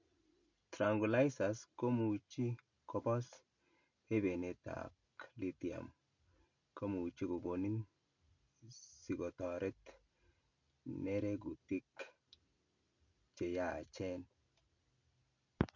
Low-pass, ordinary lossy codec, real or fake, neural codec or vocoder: 7.2 kHz; none; real; none